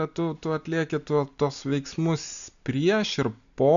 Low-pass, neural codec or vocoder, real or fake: 7.2 kHz; none; real